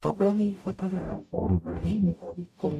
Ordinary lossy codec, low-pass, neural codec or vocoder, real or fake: MP3, 96 kbps; 14.4 kHz; codec, 44.1 kHz, 0.9 kbps, DAC; fake